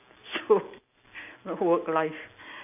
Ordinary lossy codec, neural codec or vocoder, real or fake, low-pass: none; none; real; 3.6 kHz